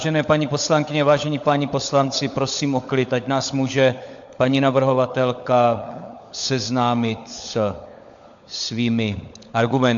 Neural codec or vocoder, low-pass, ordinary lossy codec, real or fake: codec, 16 kHz, 16 kbps, FunCodec, trained on LibriTTS, 50 frames a second; 7.2 kHz; AAC, 64 kbps; fake